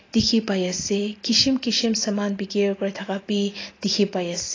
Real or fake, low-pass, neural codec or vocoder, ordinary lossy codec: real; 7.2 kHz; none; AAC, 32 kbps